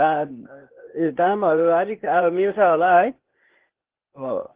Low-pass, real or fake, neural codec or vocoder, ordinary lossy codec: 3.6 kHz; fake; codec, 16 kHz, 0.8 kbps, ZipCodec; Opus, 16 kbps